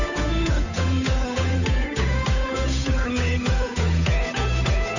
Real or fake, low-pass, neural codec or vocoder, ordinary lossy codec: fake; 7.2 kHz; codec, 16 kHz, 8 kbps, FunCodec, trained on Chinese and English, 25 frames a second; none